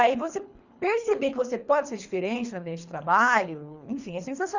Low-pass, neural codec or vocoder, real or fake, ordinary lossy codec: 7.2 kHz; codec, 24 kHz, 3 kbps, HILCodec; fake; Opus, 64 kbps